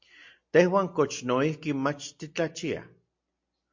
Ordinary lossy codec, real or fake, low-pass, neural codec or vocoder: MP3, 48 kbps; real; 7.2 kHz; none